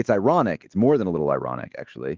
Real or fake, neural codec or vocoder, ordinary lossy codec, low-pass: fake; autoencoder, 48 kHz, 128 numbers a frame, DAC-VAE, trained on Japanese speech; Opus, 16 kbps; 7.2 kHz